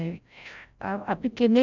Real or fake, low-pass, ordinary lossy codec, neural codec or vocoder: fake; 7.2 kHz; none; codec, 16 kHz, 0.5 kbps, FreqCodec, larger model